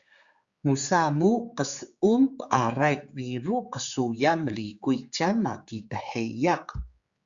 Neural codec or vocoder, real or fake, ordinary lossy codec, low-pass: codec, 16 kHz, 4 kbps, X-Codec, HuBERT features, trained on general audio; fake; Opus, 64 kbps; 7.2 kHz